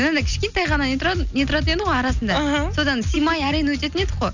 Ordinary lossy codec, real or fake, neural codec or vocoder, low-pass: none; real; none; 7.2 kHz